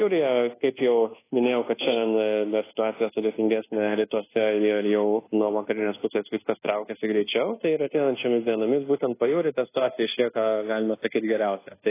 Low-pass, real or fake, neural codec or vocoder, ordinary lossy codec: 3.6 kHz; fake; codec, 24 kHz, 1.2 kbps, DualCodec; AAC, 16 kbps